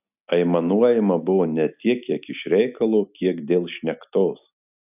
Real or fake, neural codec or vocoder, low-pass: real; none; 3.6 kHz